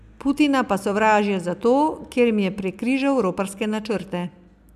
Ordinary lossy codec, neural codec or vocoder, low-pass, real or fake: none; none; 14.4 kHz; real